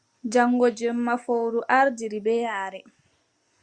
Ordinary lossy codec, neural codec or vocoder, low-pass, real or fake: Opus, 64 kbps; none; 9.9 kHz; real